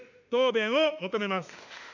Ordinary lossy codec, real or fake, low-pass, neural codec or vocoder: none; fake; 7.2 kHz; autoencoder, 48 kHz, 32 numbers a frame, DAC-VAE, trained on Japanese speech